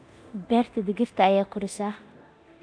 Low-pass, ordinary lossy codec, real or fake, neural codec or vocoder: 9.9 kHz; none; fake; codec, 24 kHz, 0.9 kbps, DualCodec